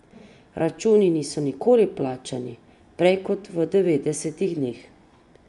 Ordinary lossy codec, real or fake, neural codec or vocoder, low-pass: none; fake; vocoder, 24 kHz, 100 mel bands, Vocos; 10.8 kHz